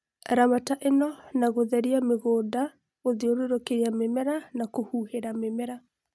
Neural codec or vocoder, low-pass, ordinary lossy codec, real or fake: none; none; none; real